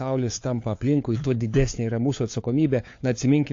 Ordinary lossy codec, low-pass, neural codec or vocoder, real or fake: AAC, 48 kbps; 7.2 kHz; codec, 16 kHz, 4 kbps, FunCodec, trained on LibriTTS, 50 frames a second; fake